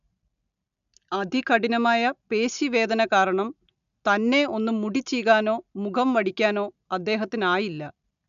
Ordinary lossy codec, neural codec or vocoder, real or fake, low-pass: none; none; real; 7.2 kHz